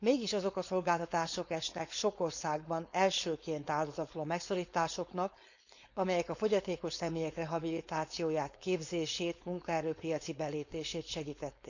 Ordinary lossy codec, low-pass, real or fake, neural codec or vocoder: none; 7.2 kHz; fake; codec, 16 kHz, 4.8 kbps, FACodec